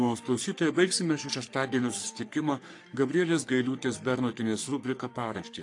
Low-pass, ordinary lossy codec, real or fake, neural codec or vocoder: 10.8 kHz; AAC, 48 kbps; fake; codec, 44.1 kHz, 3.4 kbps, Pupu-Codec